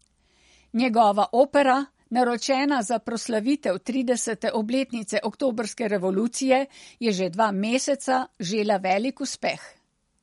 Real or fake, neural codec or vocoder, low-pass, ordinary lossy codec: real; none; 19.8 kHz; MP3, 48 kbps